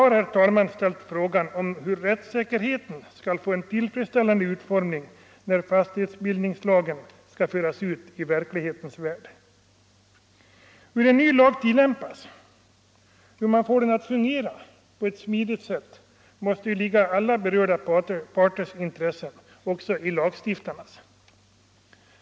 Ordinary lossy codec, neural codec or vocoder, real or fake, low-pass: none; none; real; none